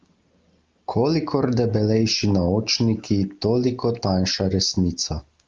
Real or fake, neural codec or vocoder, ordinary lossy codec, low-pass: real; none; Opus, 24 kbps; 7.2 kHz